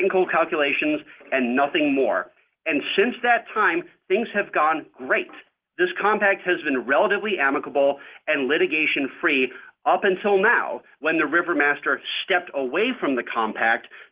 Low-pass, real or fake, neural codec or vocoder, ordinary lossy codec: 3.6 kHz; real; none; Opus, 16 kbps